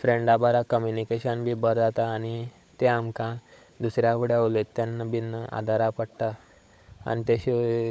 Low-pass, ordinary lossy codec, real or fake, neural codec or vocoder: none; none; fake; codec, 16 kHz, 16 kbps, FunCodec, trained on LibriTTS, 50 frames a second